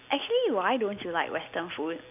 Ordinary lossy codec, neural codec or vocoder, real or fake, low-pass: none; none; real; 3.6 kHz